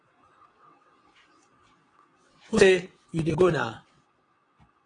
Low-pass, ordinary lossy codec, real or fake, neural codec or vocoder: 9.9 kHz; AAC, 32 kbps; fake; vocoder, 22.05 kHz, 80 mel bands, WaveNeXt